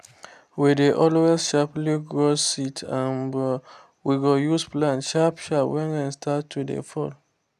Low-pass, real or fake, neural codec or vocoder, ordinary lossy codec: 14.4 kHz; real; none; none